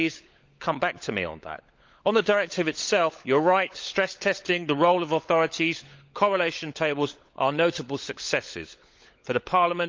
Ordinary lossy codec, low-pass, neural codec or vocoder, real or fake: Opus, 24 kbps; 7.2 kHz; codec, 16 kHz, 16 kbps, FunCodec, trained on LibriTTS, 50 frames a second; fake